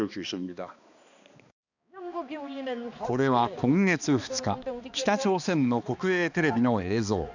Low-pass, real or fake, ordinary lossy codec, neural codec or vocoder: 7.2 kHz; fake; none; codec, 16 kHz, 2 kbps, X-Codec, HuBERT features, trained on balanced general audio